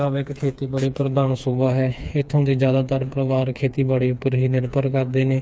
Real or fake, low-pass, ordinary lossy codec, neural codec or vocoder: fake; none; none; codec, 16 kHz, 4 kbps, FreqCodec, smaller model